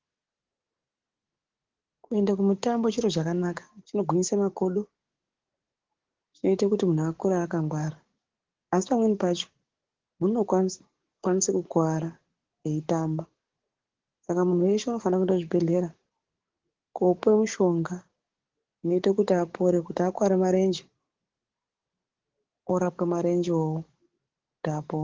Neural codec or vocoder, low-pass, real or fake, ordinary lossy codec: codec, 44.1 kHz, 7.8 kbps, DAC; 7.2 kHz; fake; Opus, 16 kbps